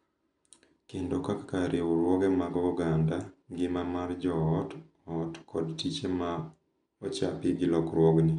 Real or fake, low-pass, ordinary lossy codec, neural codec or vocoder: real; 10.8 kHz; none; none